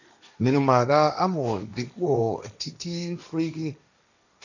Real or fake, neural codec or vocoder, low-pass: fake; codec, 16 kHz, 1.1 kbps, Voila-Tokenizer; 7.2 kHz